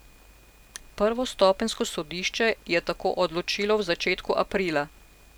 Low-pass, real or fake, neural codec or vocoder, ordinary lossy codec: none; real; none; none